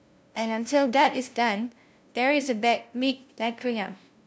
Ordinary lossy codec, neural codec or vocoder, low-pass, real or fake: none; codec, 16 kHz, 0.5 kbps, FunCodec, trained on LibriTTS, 25 frames a second; none; fake